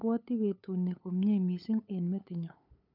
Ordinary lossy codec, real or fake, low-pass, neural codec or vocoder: none; fake; 5.4 kHz; codec, 16 kHz, 8 kbps, FunCodec, trained on LibriTTS, 25 frames a second